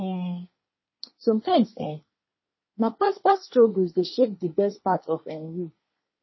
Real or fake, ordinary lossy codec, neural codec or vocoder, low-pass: fake; MP3, 24 kbps; codec, 24 kHz, 1 kbps, SNAC; 7.2 kHz